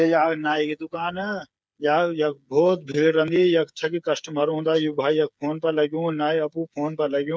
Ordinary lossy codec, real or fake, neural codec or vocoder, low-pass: none; fake; codec, 16 kHz, 8 kbps, FreqCodec, smaller model; none